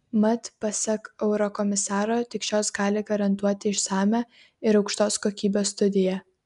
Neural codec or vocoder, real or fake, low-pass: none; real; 10.8 kHz